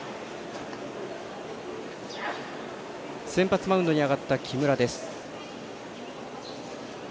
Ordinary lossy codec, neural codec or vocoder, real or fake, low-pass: none; none; real; none